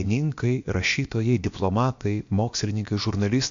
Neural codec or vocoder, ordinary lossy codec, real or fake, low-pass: codec, 16 kHz, about 1 kbps, DyCAST, with the encoder's durations; AAC, 64 kbps; fake; 7.2 kHz